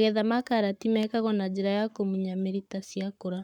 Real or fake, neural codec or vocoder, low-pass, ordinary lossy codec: fake; codec, 44.1 kHz, 7.8 kbps, Pupu-Codec; 19.8 kHz; none